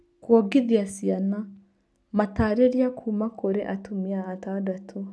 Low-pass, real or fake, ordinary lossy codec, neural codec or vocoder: none; real; none; none